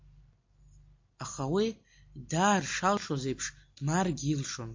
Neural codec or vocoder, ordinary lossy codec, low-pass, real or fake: none; MP3, 48 kbps; 7.2 kHz; real